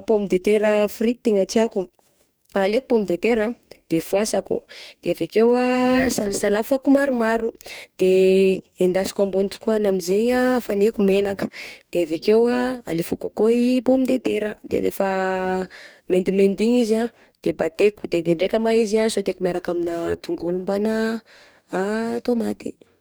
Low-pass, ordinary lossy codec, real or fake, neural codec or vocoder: none; none; fake; codec, 44.1 kHz, 2.6 kbps, DAC